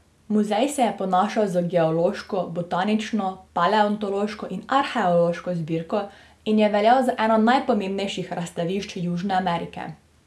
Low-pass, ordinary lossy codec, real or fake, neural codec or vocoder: none; none; real; none